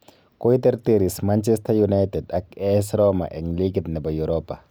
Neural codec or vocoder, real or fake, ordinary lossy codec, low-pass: none; real; none; none